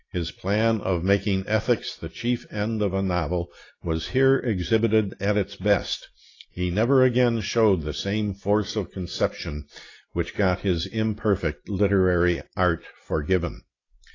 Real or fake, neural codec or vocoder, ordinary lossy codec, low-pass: real; none; AAC, 32 kbps; 7.2 kHz